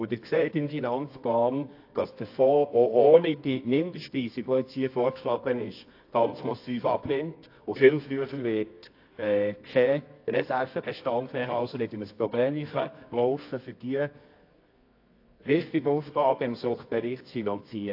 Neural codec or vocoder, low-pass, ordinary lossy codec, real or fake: codec, 24 kHz, 0.9 kbps, WavTokenizer, medium music audio release; 5.4 kHz; AAC, 32 kbps; fake